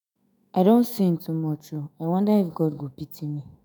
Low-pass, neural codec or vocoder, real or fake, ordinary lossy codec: none; autoencoder, 48 kHz, 128 numbers a frame, DAC-VAE, trained on Japanese speech; fake; none